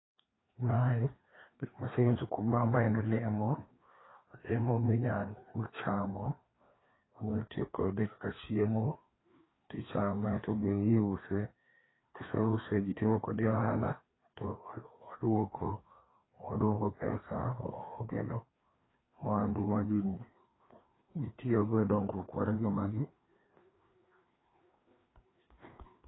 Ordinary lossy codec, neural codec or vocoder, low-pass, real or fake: AAC, 16 kbps; codec, 16 kHz, 2 kbps, FreqCodec, larger model; 7.2 kHz; fake